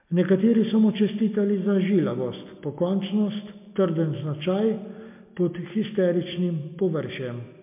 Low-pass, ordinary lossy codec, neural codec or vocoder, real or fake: 3.6 kHz; MP3, 32 kbps; none; real